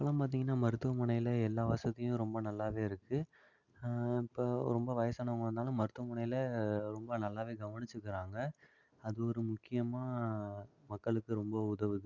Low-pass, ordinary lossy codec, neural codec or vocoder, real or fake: 7.2 kHz; Opus, 64 kbps; none; real